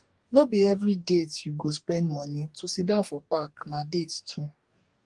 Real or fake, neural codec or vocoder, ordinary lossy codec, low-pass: fake; codec, 44.1 kHz, 2.6 kbps, DAC; Opus, 24 kbps; 10.8 kHz